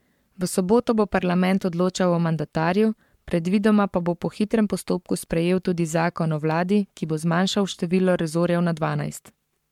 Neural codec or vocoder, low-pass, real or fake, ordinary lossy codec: codec, 44.1 kHz, 7.8 kbps, Pupu-Codec; 19.8 kHz; fake; MP3, 96 kbps